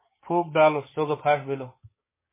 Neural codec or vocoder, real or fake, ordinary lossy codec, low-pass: codec, 16 kHz, 6 kbps, DAC; fake; MP3, 16 kbps; 3.6 kHz